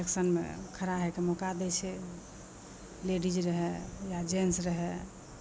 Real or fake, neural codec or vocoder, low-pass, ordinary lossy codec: real; none; none; none